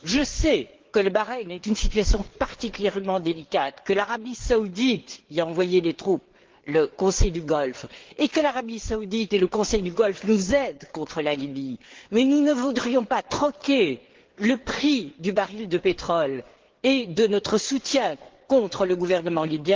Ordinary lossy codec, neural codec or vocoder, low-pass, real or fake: Opus, 16 kbps; codec, 16 kHz, 4 kbps, FreqCodec, larger model; 7.2 kHz; fake